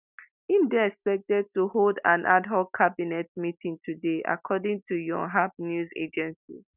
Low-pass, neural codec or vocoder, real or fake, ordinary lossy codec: 3.6 kHz; none; real; none